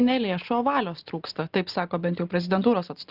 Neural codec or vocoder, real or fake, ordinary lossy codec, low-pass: none; real; Opus, 24 kbps; 5.4 kHz